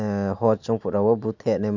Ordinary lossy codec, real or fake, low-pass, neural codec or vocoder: none; real; 7.2 kHz; none